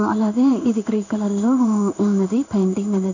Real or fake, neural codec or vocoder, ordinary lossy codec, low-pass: fake; codec, 16 kHz in and 24 kHz out, 1 kbps, XY-Tokenizer; none; 7.2 kHz